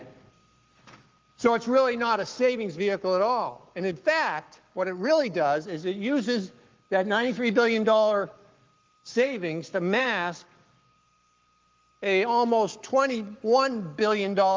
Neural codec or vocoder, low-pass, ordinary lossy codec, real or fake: codec, 44.1 kHz, 7.8 kbps, Pupu-Codec; 7.2 kHz; Opus, 32 kbps; fake